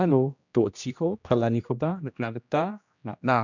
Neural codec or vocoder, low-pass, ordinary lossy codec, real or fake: codec, 16 kHz, 1 kbps, X-Codec, HuBERT features, trained on general audio; 7.2 kHz; none; fake